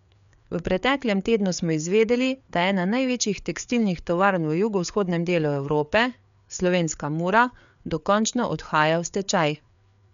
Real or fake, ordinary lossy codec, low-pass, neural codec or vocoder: fake; none; 7.2 kHz; codec, 16 kHz, 4 kbps, FreqCodec, larger model